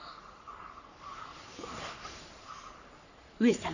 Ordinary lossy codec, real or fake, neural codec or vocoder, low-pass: none; fake; codec, 44.1 kHz, 3.4 kbps, Pupu-Codec; 7.2 kHz